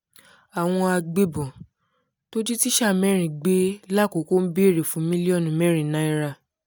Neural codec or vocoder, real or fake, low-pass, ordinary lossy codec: none; real; none; none